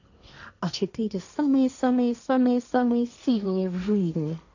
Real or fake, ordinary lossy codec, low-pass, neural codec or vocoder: fake; none; 7.2 kHz; codec, 16 kHz, 1.1 kbps, Voila-Tokenizer